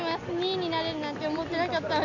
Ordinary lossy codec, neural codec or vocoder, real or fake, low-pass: none; none; real; 7.2 kHz